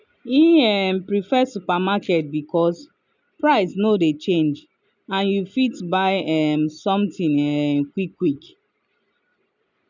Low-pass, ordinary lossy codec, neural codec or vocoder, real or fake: 7.2 kHz; none; none; real